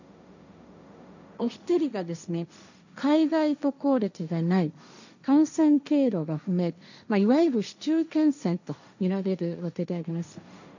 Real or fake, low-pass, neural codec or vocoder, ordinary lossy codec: fake; 7.2 kHz; codec, 16 kHz, 1.1 kbps, Voila-Tokenizer; none